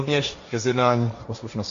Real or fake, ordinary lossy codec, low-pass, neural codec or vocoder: fake; AAC, 48 kbps; 7.2 kHz; codec, 16 kHz, 1.1 kbps, Voila-Tokenizer